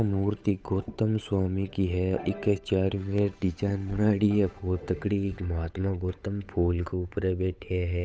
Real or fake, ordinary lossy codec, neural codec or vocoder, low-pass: fake; none; codec, 16 kHz, 8 kbps, FunCodec, trained on Chinese and English, 25 frames a second; none